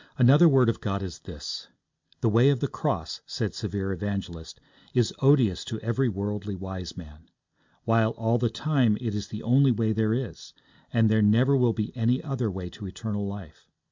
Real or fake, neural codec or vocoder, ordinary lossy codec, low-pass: real; none; MP3, 64 kbps; 7.2 kHz